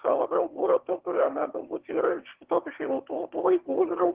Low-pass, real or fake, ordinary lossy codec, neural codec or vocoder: 3.6 kHz; fake; Opus, 16 kbps; autoencoder, 22.05 kHz, a latent of 192 numbers a frame, VITS, trained on one speaker